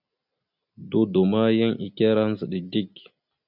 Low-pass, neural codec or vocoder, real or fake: 5.4 kHz; none; real